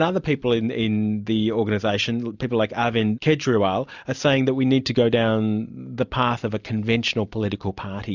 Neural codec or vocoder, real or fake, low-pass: none; real; 7.2 kHz